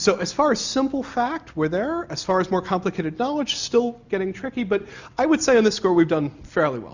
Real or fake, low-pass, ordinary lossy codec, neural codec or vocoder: real; 7.2 kHz; Opus, 64 kbps; none